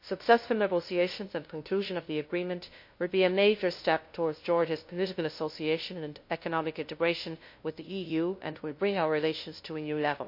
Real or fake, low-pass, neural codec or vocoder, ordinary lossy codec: fake; 5.4 kHz; codec, 16 kHz, 0.5 kbps, FunCodec, trained on LibriTTS, 25 frames a second; MP3, 32 kbps